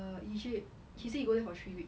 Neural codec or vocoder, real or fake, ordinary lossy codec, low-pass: none; real; none; none